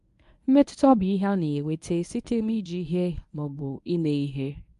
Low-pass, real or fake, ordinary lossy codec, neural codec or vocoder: 10.8 kHz; fake; MP3, 64 kbps; codec, 24 kHz, 0.9 kbps, WavTokenizer, medium speech release version 1